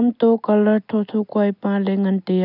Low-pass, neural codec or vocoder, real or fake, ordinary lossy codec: 5.4 kHz; none; real; none